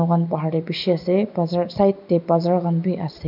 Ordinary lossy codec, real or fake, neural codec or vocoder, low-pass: none; real; none; 5.4 kHz